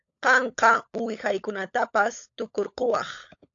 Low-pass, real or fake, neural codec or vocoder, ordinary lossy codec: 7.2 kHz; fake; codec, 16 kHz, 16 kbps, FunCodec, trained on LibriTTS, 50 frames a second; AAC, 48 kbps